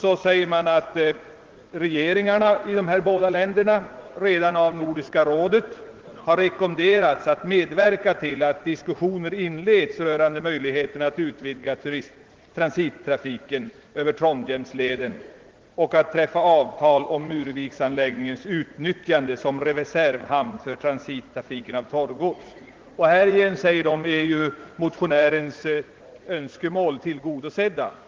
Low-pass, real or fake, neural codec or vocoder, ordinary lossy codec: 7.2 kHz; fake; vocoder, 44.1 kHz, 80 mel bands, Vocos; Opus, 16 kbps